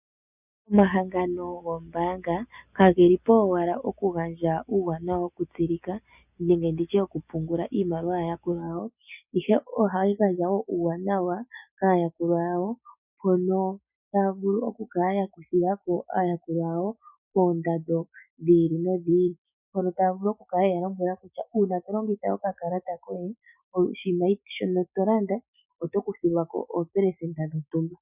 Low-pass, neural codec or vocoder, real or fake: 3.6 kHz; none; real